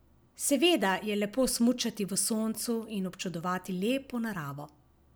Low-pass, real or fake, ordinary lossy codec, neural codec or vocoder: none; real; none; none